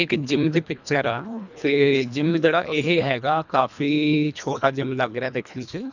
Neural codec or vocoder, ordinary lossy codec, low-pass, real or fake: codec, 24 kHz, 1.5 kbps, HILCodec; none; 7.2 kHz; fake